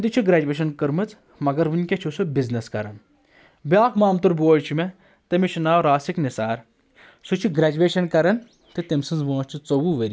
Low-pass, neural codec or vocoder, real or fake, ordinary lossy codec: none; none; real; none